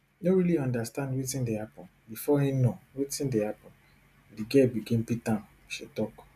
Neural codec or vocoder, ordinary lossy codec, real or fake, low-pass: none; MP3, 96 kbps; real; 14.4 kHz